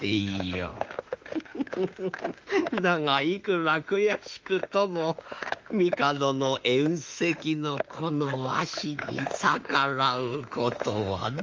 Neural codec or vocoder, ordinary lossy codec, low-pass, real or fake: autoencoder, 48 kHz, 32 numbers a frame, DAC-VAE, trained on Japanese speech; Opus, 32 kbps; 7.2 kHz; fake